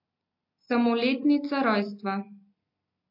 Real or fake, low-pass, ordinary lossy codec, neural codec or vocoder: real; 5.4 kHz; MP3, 32 kbps; none